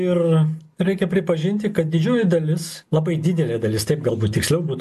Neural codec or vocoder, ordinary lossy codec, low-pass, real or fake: none; AAC, 96 kbps; 14.4 kHz; real